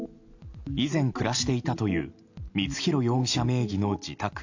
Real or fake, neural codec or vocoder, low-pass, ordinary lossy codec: real; none; 7.2 kHz; AAC, 48 kbps